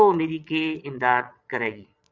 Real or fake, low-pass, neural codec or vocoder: fake; 7.2 kHz; vocoder, 22.05 kHz, 80 mel bands, Vocos